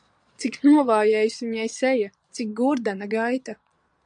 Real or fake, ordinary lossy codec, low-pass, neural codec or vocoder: fake; MP3, 96 kbps; 9.9 kHz; vocoder, 22.05 kHz, 80 mel bands, Vocos